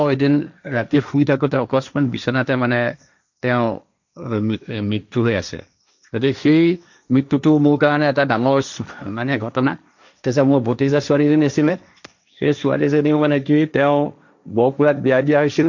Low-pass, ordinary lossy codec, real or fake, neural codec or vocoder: 7.2 kHz; none; fake; codec, 16 kHz, 1.1 kbps, Voila-Tokenizer